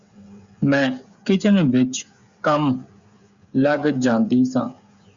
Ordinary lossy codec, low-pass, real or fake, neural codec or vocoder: Opus, 64 kbps; 7.2 kHz; fake; codec, 16 kHz, 8 kbps, FreqCodec, smaller model